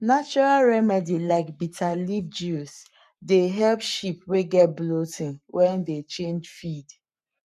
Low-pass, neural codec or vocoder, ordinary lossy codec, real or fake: 14.4 kHz; codec, 44.1 kHz, 7.8 kbps, Pupu-Codec; MP3, 96 kbps; fake